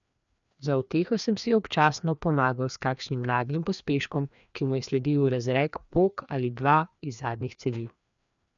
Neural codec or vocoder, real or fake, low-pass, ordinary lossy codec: codec, 16 kHz, 2 kbps, FreqCodec, larger model; fake; 7.2 kHz; none